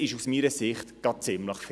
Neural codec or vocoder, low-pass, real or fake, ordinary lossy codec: none; none; real; none